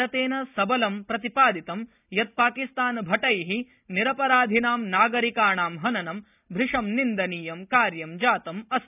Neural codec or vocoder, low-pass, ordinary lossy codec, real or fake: none; 3.6 kHz; none; real